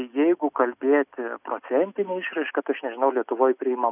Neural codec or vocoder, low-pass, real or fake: none; 3.6 kHz; real